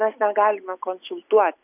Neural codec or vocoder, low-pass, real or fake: none; 3.6 kHz; real